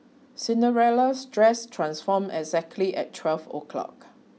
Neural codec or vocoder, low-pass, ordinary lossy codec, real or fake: none; none; none; real